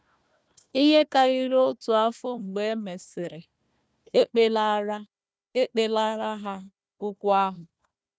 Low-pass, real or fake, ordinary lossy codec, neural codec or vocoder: none; fake; none; codec, 16 kHz, 1 kbps, FunCodec, trained on Chinese and English, 50 frames a second